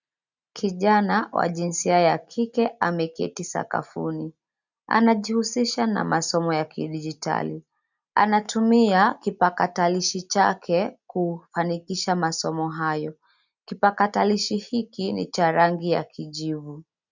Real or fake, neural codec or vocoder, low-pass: real; none; 7.2 kHz